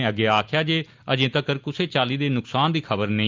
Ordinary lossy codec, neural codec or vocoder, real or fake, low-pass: Opus, 24 kbps; none; real; 7.2 kHz